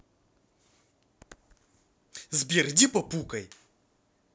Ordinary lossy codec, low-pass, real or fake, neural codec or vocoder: none; none; real; none